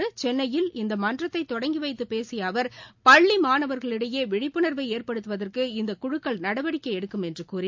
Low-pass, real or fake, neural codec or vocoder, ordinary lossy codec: 7.2 kHz; real; none; MP3, 64 kbps